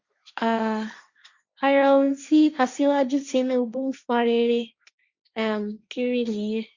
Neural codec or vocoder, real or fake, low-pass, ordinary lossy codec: codec, 16 kHz, 1.1 kbps, Voila-Tokenizer; fake; 7.2 kHz; Opus, 64 kbps